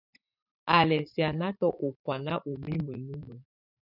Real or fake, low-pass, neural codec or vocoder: fake; 5.4 kHz; vocoder, 44.1 kHz, 80 mel bands, Vocos